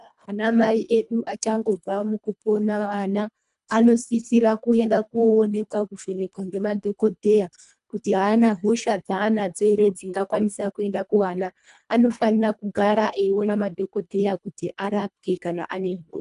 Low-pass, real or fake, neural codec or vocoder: 10.8 kHz; fake; codec, 24 kHz, 1.5 kbps, HILCodec